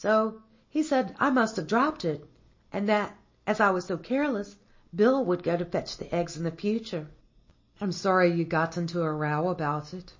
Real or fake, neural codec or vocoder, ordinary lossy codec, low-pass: real; none; MP3, 32 kbps; 7.2 kHz